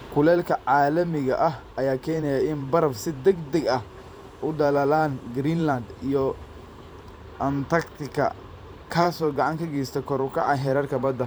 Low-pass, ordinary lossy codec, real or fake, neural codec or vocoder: none; none; fake; vocoder, 44.1 kHz, 128 mel bands every 512 samples, BigVGAN v2